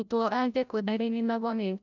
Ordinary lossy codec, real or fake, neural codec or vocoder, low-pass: none; fake; codec, 16 kHz, 0.5 kbps, FreqCodec, larger model; 7.2 kHz